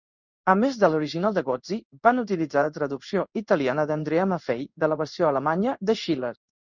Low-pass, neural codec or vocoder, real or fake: 7.2 kHz; codec, 16 kHz in and 24 kHz out, 1 kbps, XY-Tokenizer; fake